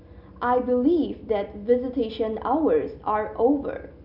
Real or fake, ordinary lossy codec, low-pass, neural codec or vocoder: real; none; 5.4 kHz; none